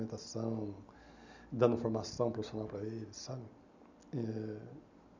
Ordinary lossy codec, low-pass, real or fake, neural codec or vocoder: none; 7.2 kHz; real; none